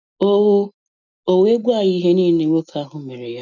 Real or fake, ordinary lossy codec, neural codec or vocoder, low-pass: real; none; none; 7.2 kHz